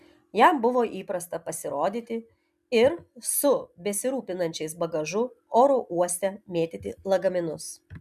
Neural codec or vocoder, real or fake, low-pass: none; real; 14.4 kHz